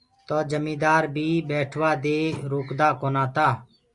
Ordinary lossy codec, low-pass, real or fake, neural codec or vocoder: Opus, 64 kbps; 10.8 kHz; real; none